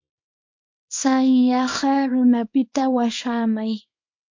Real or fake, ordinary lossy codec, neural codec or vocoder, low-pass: fake; MP3, 48 kbps; codec, 24 kHz, 0.9 kbps, WavTokenizer, small release; 7.2 kHz